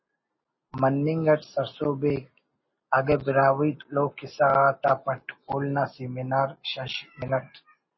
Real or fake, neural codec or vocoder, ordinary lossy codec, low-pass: real; none; MP3, 24 kbps; 7.2 kHz